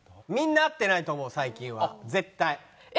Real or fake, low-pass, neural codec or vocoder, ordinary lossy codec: real; none; none; none